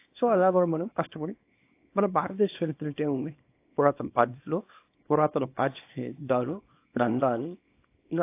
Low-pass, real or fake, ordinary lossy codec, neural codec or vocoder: 3.6 kHz; fake; AAC, 24 kbps; codec, 24 kHz, 0.9 kbps, WavTokenizer, small release